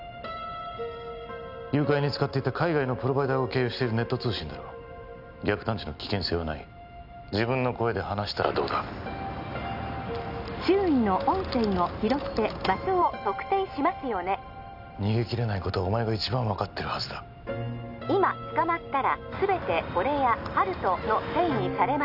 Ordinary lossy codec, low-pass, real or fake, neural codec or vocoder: none; 5.4 kHz; real; none